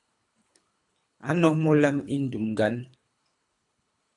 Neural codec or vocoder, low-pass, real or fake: codec, 24 kHz, 3 kbps, HILCodec; 10.8 kHz; fake